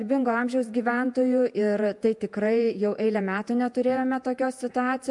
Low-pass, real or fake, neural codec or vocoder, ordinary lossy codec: 10.8 kHz; fake; vocoder, 48 kHz, 128 mel bands, Vocos; MP3, 64 kbps